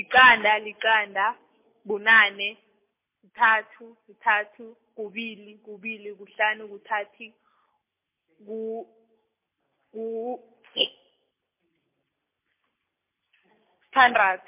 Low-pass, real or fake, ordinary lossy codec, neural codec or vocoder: 3.6 kHz; real; MP3, 24 kbps; none